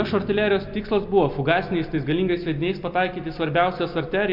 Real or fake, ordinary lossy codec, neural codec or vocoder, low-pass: real; MP3, 48 kbps; none; 5.4 kHz